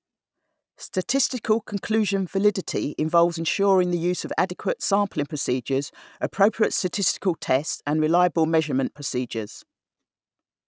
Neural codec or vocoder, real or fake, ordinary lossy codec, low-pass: none; real; none; none